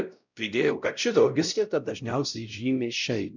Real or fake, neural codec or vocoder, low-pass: fake; codec, 16 kHz, 0.5 kbps, X-Codec, WavLM features, trained on Multilingual LibriSpeech; 7.2 kHz